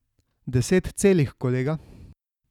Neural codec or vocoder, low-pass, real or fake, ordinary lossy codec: none; 19.8 kHz; real; none